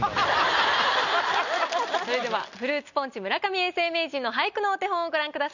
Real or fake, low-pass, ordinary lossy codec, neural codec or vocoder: real; 7.2 kHz; none; none